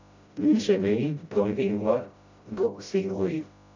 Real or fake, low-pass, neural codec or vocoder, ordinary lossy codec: fake; 7.2 kHz; codec, 16 kHz, 0.5 kbps, FreqCodec, smaller model; AAC, 48 kbps